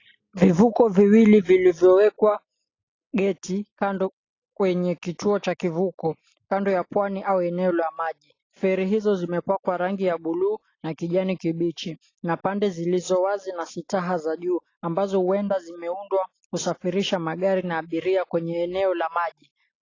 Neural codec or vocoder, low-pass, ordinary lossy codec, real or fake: none; 7.2 kHz; AAC, 32 kbps; real